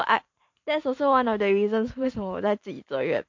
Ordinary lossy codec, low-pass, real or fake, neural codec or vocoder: MP3, 48 kbps; 7.2 kHz; fake; vocoder, 44.1 kHz, 128 mel bands every 512 samples, BigVGAN v2